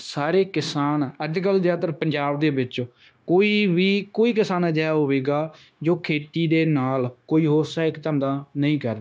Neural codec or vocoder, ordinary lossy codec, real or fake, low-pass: codec, 16 kHz, 0.9 kbps, LongCat-Audio-Codec; none; fake; none